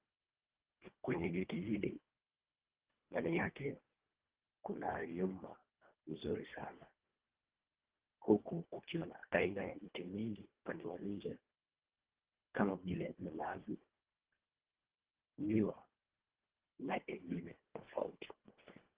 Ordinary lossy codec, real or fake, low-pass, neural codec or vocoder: Opus, 16 kbps; fake; 3.6 kHz; codec, 24 kHz, 1.5 kbps, HILCodec